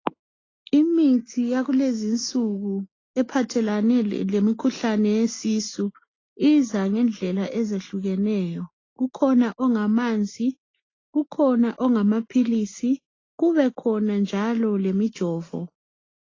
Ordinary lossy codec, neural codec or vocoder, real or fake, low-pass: AAC, 32 kbps; none; real; 7.2 kHz